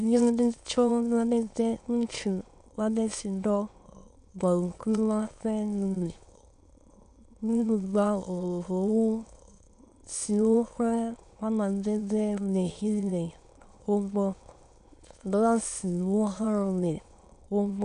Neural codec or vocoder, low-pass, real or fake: autoencoder, 22.05 kHz, a latent of 192 numbers a frame, VITS, trained on many speakers; 9.9 kHz; fake